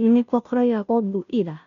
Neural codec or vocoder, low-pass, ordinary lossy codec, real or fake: codec, 16 kHz, 0.5 kbps, FunCodec, trained on Chinese and English, 25 frames a second; 7.2 kHz; none; fake